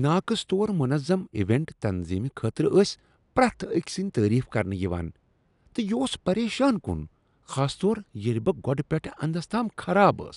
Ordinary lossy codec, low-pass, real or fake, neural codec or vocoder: none; 10.8 kHz; real; none